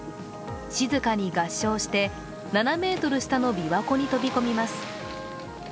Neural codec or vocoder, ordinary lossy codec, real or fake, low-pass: none; none; real; none